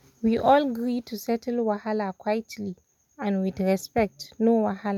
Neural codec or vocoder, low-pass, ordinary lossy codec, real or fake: none; 19.8 kHz; none; real